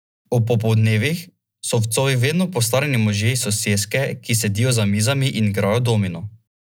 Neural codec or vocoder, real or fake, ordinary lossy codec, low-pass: none; real; none; none